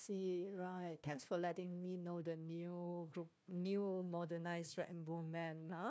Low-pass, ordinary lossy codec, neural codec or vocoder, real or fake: none; none; codec, 16 kHz, 1 kbps, FunCodec, trained on Chinese and English, 50 frames a second; fake